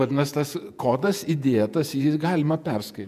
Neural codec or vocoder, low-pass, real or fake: vocoder, 48 kHz, 128 mel bands, Vocos; 14.4 kHz; fake